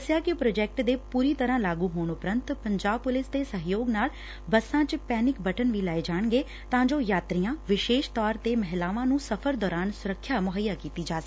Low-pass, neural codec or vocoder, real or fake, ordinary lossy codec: none; none; real; none